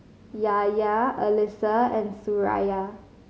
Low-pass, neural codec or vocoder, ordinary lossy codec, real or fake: none; none; none; real